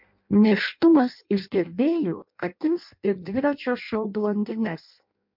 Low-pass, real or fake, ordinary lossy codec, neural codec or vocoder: 5.4 kHz; fake; MP3, 48 kbps; codec, 16 kHz in and 24 kHz out, 0.6 kbps, FireRedTTS-2 codec